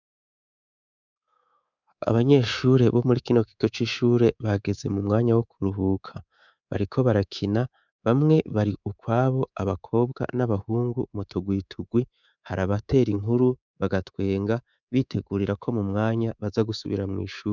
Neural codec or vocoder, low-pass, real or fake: codec, 24 kHz, 3.1 kbps, DualCodec; 7.2 kHz; fake